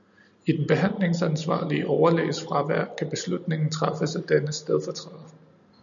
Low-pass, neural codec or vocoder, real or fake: 7.2 kHz; none; real